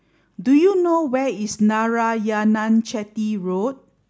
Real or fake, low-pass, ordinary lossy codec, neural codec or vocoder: real; none; none; none